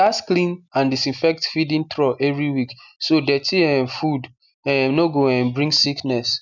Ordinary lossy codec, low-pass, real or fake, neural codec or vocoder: none; 7.2 kHz; real; none